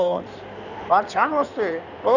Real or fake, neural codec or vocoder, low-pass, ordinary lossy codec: fake; codec, 16 kHz in and 24 kHz out, 2.2 kbps, FireRedTTS-2 codec; 7.2 kHz; none